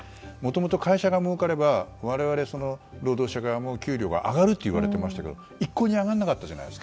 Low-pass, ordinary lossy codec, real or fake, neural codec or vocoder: none; none; real; none